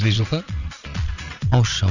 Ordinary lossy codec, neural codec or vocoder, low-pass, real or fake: none; vocoder, 22.05 kHz, 80 mel bands, Vocos; 7.2 kHz; fake